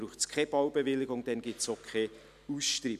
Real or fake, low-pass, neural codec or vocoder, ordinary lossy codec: real; 14.4 kHz; none; none